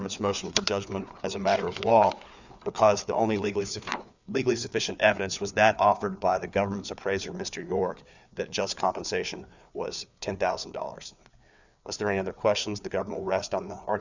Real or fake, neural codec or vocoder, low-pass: fake; codec, 16 kHz, 4 kbps, FreqCodec, larger model; 7.2 kHz